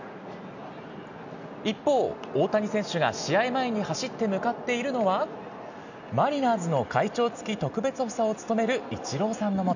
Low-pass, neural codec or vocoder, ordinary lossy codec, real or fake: 7.2 kHz; none; none; real